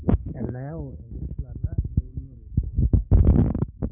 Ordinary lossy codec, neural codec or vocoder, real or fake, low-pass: none; codec, 16 kHz, 6 kbps, DAC; fake; 3.6 kHz